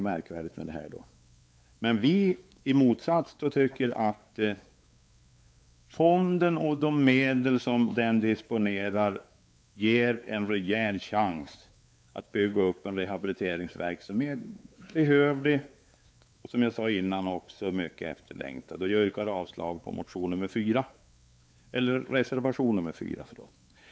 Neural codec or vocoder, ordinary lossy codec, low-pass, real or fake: codec, 16 kHz, 4 kbps, X-Codec, WavLM features, trained on Multilingual LibriSpeech; none; none; fake